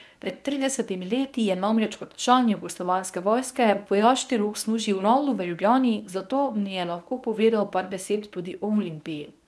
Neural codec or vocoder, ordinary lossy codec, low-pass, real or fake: codec, 24 kHz, 0.9 kbps, WavTokenizer, medium speech release version 1; none; none; fake